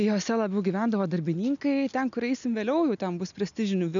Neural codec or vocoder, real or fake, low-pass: none; real; 7.2 kHz